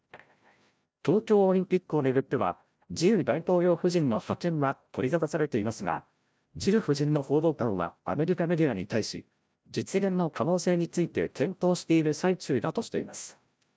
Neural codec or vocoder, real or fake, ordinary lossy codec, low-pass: codec, 16 kHz, 0.5 kbps, FreqCodec, larger model; fake; none; none